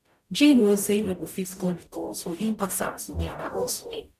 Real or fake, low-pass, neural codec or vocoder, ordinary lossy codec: fake; 14.4 kHz; codec, 44.1 kHz, 0.9 kbps, DAC; none